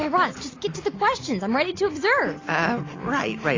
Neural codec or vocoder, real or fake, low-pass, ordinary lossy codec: none; real; 7.2 kHz; AAC, 32 kbps